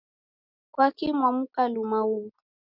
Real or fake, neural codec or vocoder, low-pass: real; none; 5.4 kHz